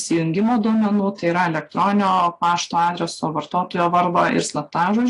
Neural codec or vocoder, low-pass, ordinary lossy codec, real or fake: none; 10.8 kHz; AAC, 48 kbps; real